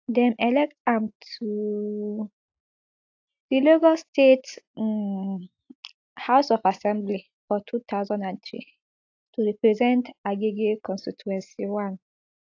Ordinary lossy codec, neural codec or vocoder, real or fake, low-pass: AAC, 48 kbps; none; real; 7.2 kHz